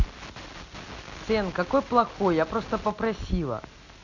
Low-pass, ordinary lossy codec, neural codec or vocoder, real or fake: 7.2 kHz; none; none; real